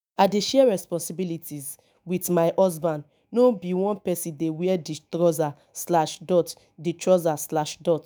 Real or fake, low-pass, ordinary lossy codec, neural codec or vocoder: fake; none; none; autoencoder, 48 kHz, 128 numbers a frame, DAC-VAE, trained on Japanese speech